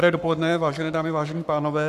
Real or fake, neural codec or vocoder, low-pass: fake; codec, 44.1 kHz, 7.8 kbps, Pupu-Codec; 14.4 kHz